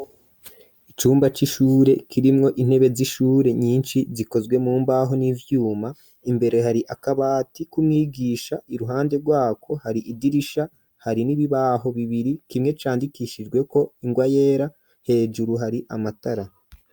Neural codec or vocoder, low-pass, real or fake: none; 19.8 kHz; real